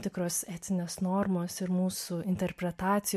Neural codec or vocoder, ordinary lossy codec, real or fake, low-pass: none; MP3, 64 kbps; real; 14.4 kHz